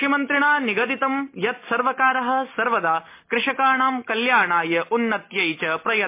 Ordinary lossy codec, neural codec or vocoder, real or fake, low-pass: MP3, 24 kbps; none; real; 3.6 kHz